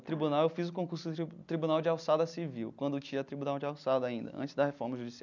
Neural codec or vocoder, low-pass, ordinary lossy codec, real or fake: none; 7.2 kHz; none; real